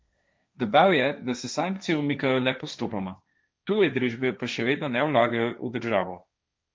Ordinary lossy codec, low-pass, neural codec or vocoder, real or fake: none; none; codec, 16 kHz, 1.1 kbps, Voila-Tokenizer; fake